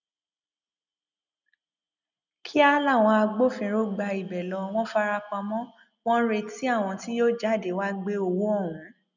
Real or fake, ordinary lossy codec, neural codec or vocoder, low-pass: real; none; none; 7.2 kHz